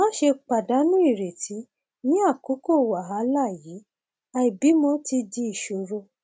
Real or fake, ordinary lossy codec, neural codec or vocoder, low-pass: real; none; none; none